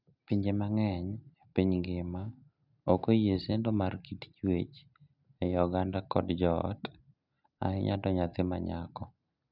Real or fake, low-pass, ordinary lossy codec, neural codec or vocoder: real; 5.4 kHz; none; none